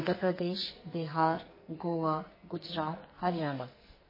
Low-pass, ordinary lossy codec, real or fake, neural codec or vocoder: 5.4 kHz; MP3, 24 kbps; fake; codec, 44.1 kHz, 2.6 kbps, SNAC